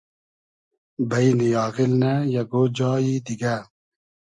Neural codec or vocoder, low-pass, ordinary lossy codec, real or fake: none; 10.8 kHz; MP3, 96 kbps; real